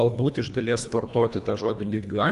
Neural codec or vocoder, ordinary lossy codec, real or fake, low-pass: codec, 24 kHz, 1.5 kbps, HILCodec; Opus, 64 kbps; fake; 10.8 kHz